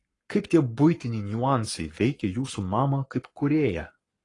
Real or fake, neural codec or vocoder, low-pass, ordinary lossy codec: fake; codec, 44.1 kHz, 7.8 kbps, Pupu-Codec; 10.8 kHz; AAC, 32 kbps